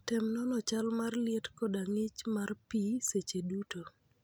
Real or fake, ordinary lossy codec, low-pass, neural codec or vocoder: real; none; none; none